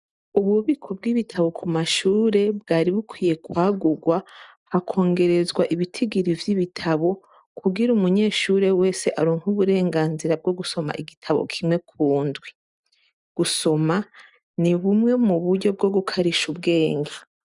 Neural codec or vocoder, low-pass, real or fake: none; 10.8 kHz; real